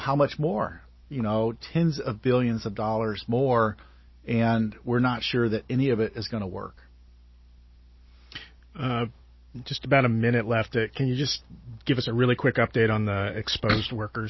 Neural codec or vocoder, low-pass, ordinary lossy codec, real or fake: none; 7.2 kHz; MP3, 24 kbps; real